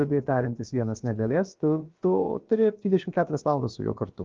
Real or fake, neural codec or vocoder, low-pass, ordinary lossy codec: fake; codec, 16 kHz, about 1 kbps, DyCAST, with the encoder's durations; 7.2 kHz; Opus, 24 kbps